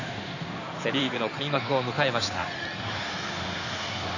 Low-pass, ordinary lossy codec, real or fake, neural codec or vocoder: 7.2 kHz; none; fake; codec, 16 kHz in and 24 kHz out, 2.2 kbps, FireRedTTS-2 codec